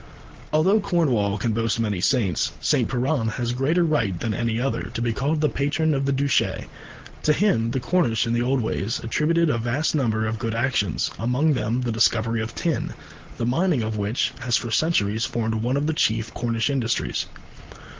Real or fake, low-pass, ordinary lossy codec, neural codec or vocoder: real; 7.2 kHz; Opus, 16 kbps; none